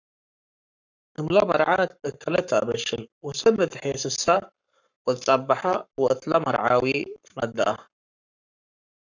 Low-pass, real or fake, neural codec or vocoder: 7.2 kHz; fake; codec, 44.1 kHz, 7.8 kbps, Pupu-Codec